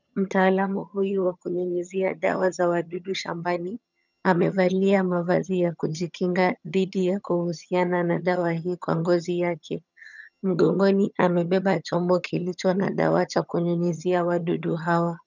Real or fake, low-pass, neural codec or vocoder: fake; 7.2 kHz; vocoder, 22.05 kHz, 80 mel bands, HiFi-GAN